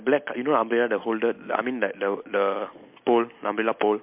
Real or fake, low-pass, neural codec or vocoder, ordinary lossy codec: real; 3.6 kHz; none; MP3, 32 kbps